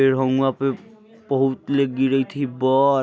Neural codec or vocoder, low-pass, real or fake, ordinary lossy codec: none; none; real; none